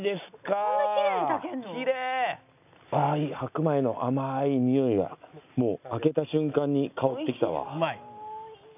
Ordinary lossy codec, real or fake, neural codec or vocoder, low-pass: none; real; none; 3.6 kHz